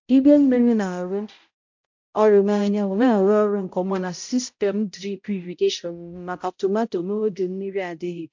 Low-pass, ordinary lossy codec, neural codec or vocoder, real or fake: 7.2 kHz; MP3, 48 kbps; codec, 16 kHz, 0.5 kbps, X-Codec, HuBERT features, trained on balanced general audio; fake